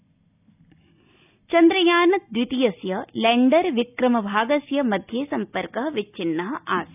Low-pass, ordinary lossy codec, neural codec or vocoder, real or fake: 3.6 kHz; none; none; real